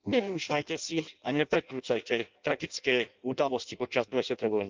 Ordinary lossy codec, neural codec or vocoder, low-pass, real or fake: Opus, 24 kbps; codec, 16 kHz in and 24 kHz out, 0.6 kbps, FireRedTTS-2 codec; 7.2 kHz; fake